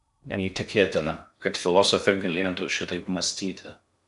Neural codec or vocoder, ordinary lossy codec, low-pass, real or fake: codec, 16 kHz in and 24 kHz out, 0.6 kbps, FocalCodec, streaming, 2048 codes; MP3, 96 kbps; 10.8 kHz; fake